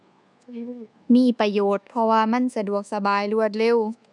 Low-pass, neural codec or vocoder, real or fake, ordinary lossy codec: 10.8 kHz; codec, 24 kHz, 1.2 kbps, DualCodec; fake; none